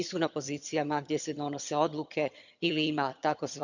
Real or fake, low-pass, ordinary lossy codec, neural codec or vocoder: fake; 7.2 kHz; none; vocoder, 22.05 kHz, 80 mel bands, HiFi-GAN